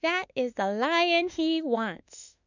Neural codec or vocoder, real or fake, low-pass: autoencoder, 48 kHz, 32 numbers a frame, DAC-VAE, trained on Japanese speech; fake; 7.2 kHz